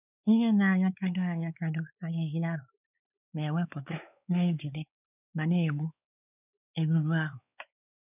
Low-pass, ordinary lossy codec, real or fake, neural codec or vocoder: 3.6 kHz; none; fake; codec, 16 kHz, 4 kbps, X-Codec, WavLM features, trained on Multilingual LibriSpeech